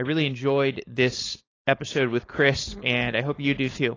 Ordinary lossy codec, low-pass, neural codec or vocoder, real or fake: AAC, 32 kbps; 7.2 kHz; codec, 16 kHz, 4.8 kbps, FACodec; fake